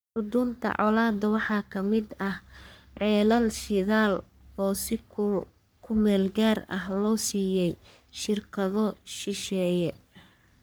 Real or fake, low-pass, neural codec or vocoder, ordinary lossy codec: fake; none; codec, 44.1 kHz, 3.4 kbps, Pupu-Codec; none